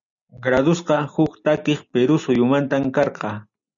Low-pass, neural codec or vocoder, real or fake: 7.2 kHz; none; real